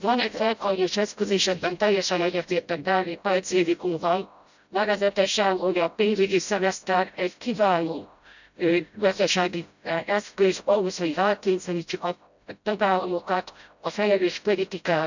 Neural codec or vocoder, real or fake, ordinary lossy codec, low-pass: codec, 16 kHz, 0.5 kbps, FreqCodec, smaller model; fake; none; 7.2 kHz